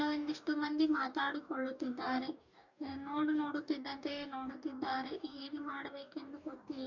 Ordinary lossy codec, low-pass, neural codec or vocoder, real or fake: none; 7.2 kHz; codec, 44.1 kHz, 2.6 kbps, DAC; fake